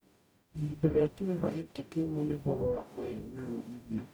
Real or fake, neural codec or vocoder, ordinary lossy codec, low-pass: fake; codec, 44.1 kHz, 0.9 kbps, DAC; none; none